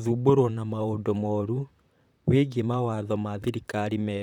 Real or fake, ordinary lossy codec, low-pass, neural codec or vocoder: fake; none; 19.8 kHz; vocoder, 44.1 kHz, 128 mel bands, Pupu-Vocoder